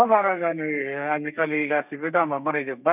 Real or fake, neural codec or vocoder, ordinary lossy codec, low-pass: fake; codec, 32 kHz, 1.9 kbps, SNAC; none; 3.6 kHz